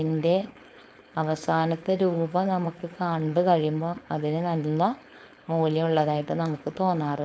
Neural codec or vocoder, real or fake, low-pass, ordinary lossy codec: codec, 16 kHz, 4.8 kbps, FACodec; fake; none; none